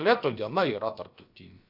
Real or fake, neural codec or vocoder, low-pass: fake; codec, 16 kHz, about 1 kbps, DyCAST, with the encoder's durations; 5.4 kHz